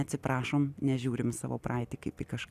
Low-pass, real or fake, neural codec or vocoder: 14.4 kHz; real; none